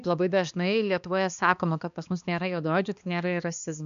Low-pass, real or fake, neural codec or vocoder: 7.2 kHz; fake; codec, 16 kHz, 2 kbps, X-Codec, HuBERT features, trained on balanced general audio